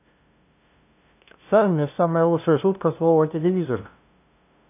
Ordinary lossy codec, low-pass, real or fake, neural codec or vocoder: none; 3.6 kHz; fake; codec, 16 kHz, 0.5 kbps, FunCodec, trained on LibriTTS, 25 frames a second